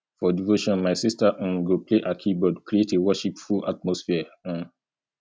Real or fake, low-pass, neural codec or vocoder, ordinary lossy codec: real; none; none; none